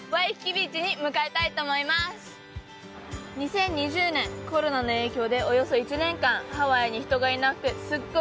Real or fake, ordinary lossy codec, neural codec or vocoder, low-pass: real; none; none; none